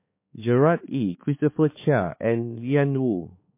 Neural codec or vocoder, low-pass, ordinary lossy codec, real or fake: codec, 16 kHz, 4 kbps, X-Codec, HuBERT features, trained on balanced general audio; 3.6 kHz; MP3, 24 kbps; fake